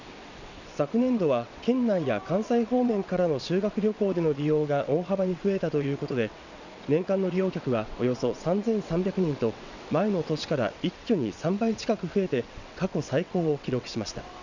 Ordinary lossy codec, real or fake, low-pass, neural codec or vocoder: none; fake; 7.2 kHz; vocoder, 22.05 kHz, 80 mel bands, WaveNeXt